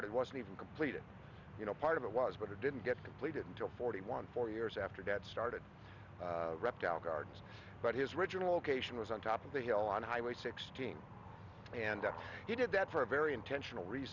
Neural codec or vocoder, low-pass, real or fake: none; 7.2 kHz; real